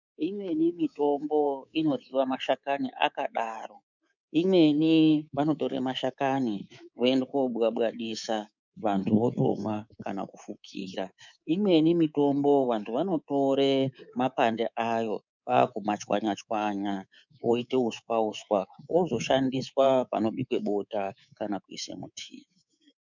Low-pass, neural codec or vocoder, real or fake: 7.2 kHz; codec, 24 kHz, 3.1 kbps, DualCodec; fake